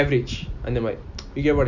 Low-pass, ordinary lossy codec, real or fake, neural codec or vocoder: 7.2 kHz; none; real; none